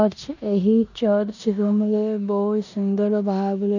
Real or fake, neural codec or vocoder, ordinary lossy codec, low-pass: fake; codec, 16 kHz in and 24 kHz out, 0.9 kbps, LongCat-Audio-Codec, four codebook decoder; none; 7.2 kHz